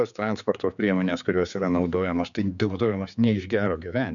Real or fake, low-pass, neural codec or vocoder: fake; 7.2 kHz; codec, 16 kHz, 4 kbps, X-Codec, HuBERT features, trained on general audio